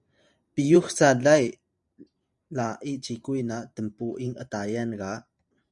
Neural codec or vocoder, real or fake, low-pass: vocoder, 44.1 kHz, 128 mel bands every 512 samples, BigVGAN v2; fake; 10.8 kHz